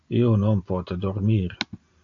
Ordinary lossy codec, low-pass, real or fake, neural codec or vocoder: AAC, 48 kbps; 7.2 kHz; real; none